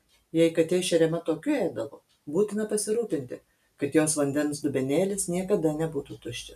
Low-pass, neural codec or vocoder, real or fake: 14.4 kHz; none; real